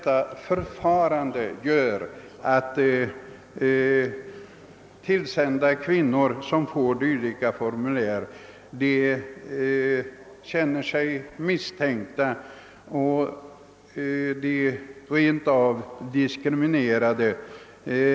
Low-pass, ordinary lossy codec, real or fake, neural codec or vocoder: none; none; real; none